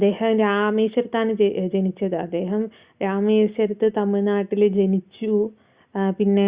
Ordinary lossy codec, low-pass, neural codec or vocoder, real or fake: Opus, 64 kbps; 3.6 kHz; none; real